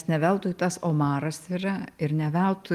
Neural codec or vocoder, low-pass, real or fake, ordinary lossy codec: none; 14.4 kHz; real; Opus, 32 kbps